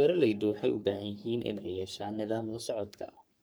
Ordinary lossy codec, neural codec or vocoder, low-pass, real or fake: none; codec, 44.1 kHz, 3.4 kbps, Pupu-Codec; none; fake